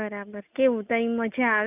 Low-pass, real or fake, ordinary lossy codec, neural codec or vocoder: 3.6 kHz; real; none; none